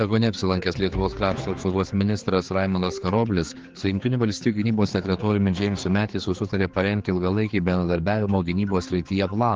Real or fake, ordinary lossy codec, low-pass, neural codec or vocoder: fake; Opus, 16 kbps; 7.2 kHz; codec, 16 kHz, 4 kbps, X-Codec, HuBERT features, trained on general audio